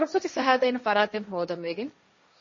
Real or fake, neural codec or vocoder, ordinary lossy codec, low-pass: fake; codec, 16 kHz, 1.1 kbps, Voila-Tokenizer; MP3, 32 kbps; 7.2 kHz